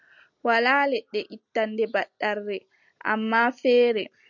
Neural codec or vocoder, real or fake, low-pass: none; real; 7.2 kHz